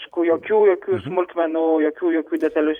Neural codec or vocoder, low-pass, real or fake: vocoder, 44.1 kHz, 128 mel bands every 512 samples, BigVGAN v2; 19.8 kHz; fake